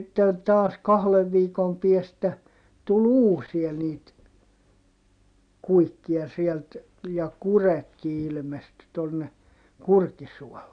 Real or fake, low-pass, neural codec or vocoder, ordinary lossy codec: real; 9.9 kHz; none; none